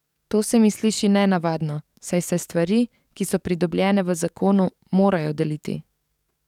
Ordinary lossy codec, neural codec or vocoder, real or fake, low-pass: none; codec, 44.1 kHz, 7.8 kbps, DAC; fake; 19.8 kHz